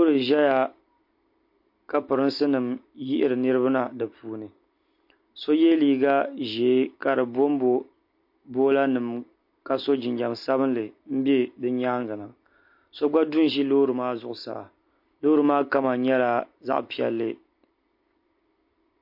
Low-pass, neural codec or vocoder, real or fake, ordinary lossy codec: 5.4 kHz; none; real; MP3, 32 kbps